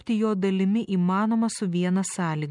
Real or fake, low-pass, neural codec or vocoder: real; 10.8 kHz; none